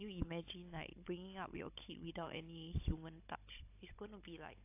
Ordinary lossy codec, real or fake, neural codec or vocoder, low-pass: AAC, 32 kbps; fake; codec, 16 kHz, 16 kbps, FunCodec, trained on Chinese and English, 50 frames a second; 3.6 kHz